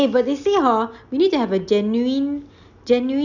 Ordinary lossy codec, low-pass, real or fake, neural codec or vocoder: none; 7.2 kHz; real; none